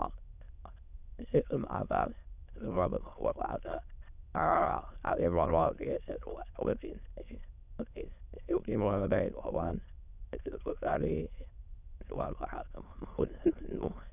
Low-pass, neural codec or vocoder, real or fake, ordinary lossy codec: 3.6 kHz; autoencoder, 22.05 kHz, a latent of 192 numbers a frame, VITS, trained on many speakers; fake; none